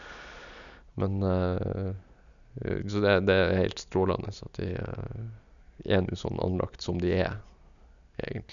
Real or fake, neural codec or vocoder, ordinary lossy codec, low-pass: real; none; none; 7.2 kHz